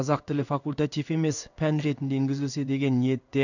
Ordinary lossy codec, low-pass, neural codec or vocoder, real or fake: none; 7.2 kHz; codec, 16 kHz in and 24 kHz out, 1 kbps, XY-Tokenizer; fake